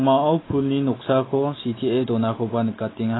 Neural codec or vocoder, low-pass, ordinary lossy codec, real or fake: none; 7.2 kHz; AAC, 16 kbps; real